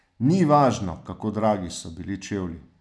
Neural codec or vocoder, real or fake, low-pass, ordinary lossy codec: none; real; none; none